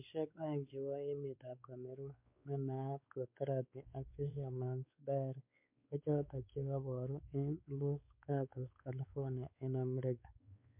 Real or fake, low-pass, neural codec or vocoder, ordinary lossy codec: fake; 3.6 kHz; codec, 16 kHz, 4 kbps, X-Codec, WavLM features, trained on Multilingual LibriSpeech; MP3, 32 kbps